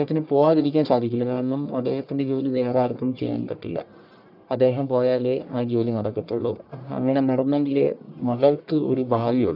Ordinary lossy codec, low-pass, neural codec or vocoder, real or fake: none; 5.4 kHz; codec, 44.1 kHz, 1.7 kbps, Pupu-Codec; fake